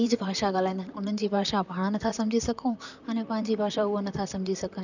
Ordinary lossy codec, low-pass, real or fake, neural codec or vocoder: none; 7.2 kHz; fake; vocoder, 44.1 kHz, 128 mel bands, Pupu-Vocoder